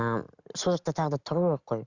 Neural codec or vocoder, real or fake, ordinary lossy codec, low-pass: none; real; Opus, 64 kbps; 7.2 kHz